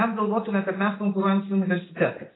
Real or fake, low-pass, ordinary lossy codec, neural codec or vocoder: real; 7.2 kHz; AAC, 16 kbps; none